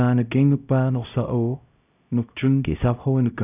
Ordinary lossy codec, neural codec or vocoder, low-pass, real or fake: none; codec, 16 kHz, 0.5 kbps, X-Codec, HuBERT features, trained on LibriSpeech; 3.6 kHz; fake